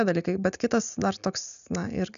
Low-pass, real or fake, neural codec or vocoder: 7.2 kHz; real; none